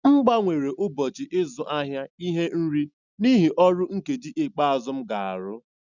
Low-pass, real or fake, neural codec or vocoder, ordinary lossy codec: 7.2 kHz; real; none; none